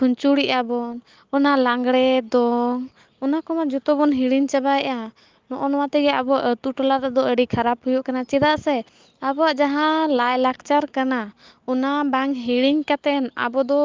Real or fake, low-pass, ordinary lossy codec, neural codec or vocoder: real; 7.2 kHz; Opus, 32 kbps; none